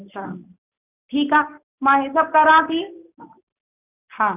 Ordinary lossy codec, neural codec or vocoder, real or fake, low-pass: none; none; real; 3.6 kHz